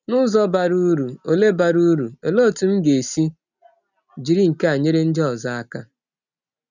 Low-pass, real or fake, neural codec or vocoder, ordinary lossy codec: 7.2 kHz; real; none; none